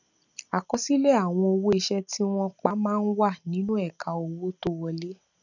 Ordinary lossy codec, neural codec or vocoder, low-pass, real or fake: none; none; 7.2 kHz; real